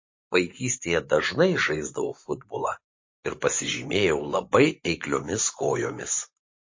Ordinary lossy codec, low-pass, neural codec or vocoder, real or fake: MP3, 32 kbps; 7.2 kHz; vocoder, 24 kHz, 100 mel bands, Vocos; fake